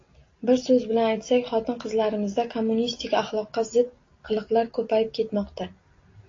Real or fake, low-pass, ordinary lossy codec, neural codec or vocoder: real; 7.2 kHz; AAC, 32 kbps; none